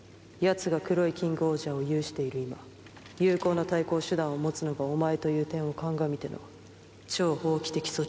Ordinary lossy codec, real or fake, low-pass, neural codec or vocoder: none; real; none; none